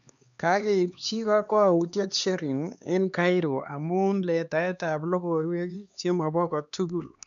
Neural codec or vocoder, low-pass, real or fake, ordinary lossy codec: codec, 16 kHz, 2 kbps, X-Codec, HuBERT features, trained on LibriSpeech; 7.2 kHz; fake; none